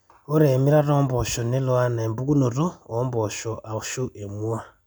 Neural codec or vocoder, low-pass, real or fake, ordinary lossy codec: none; none; real; none